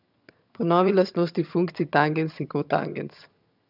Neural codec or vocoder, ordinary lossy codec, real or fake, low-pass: vocoder, 22.05 kHz, 80 mel bands, HiFi-GAN; none; fake; 5.4 kHz